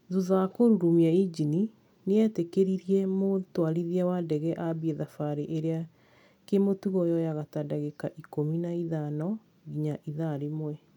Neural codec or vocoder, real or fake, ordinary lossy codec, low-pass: none; real; none; 19.8 kHz